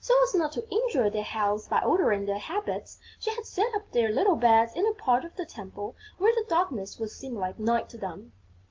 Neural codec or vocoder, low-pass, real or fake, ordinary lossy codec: none; 7.2 kHz; real; Opus, 32 kbps